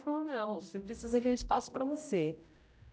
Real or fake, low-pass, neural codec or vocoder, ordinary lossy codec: fake; none; codec, 16 kHz, 0.5 kbps, X-Codec, HuBERT features, trained on general audio; none